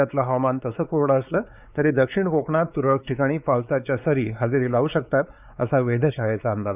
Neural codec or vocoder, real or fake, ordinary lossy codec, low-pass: codec, 16 kHz, 4 kbps, X-Codec, WavLM features, trained on Multilingual LibriSpeech; fake; none; 3.6 kHz